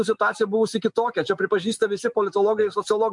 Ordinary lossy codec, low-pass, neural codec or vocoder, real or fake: MP3, 64 kbps; 10.8 kHz; none; real